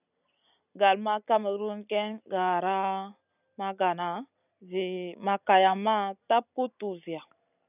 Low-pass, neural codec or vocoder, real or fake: 3.6 kHz; none; real